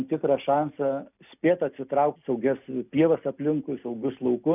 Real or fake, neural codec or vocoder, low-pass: real; none; 3.6 kHz